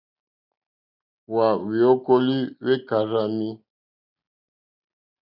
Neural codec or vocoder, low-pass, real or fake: none; 5.4 kHz; real